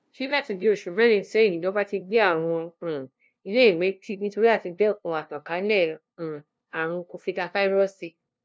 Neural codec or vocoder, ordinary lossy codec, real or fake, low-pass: codec, 16 kHz, 0.5 kbps, FunCodec, trained on LibriTTS, 25 frames a second; none; fake; none